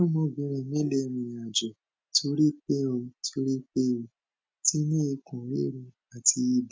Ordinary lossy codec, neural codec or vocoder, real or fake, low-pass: none; none; real; none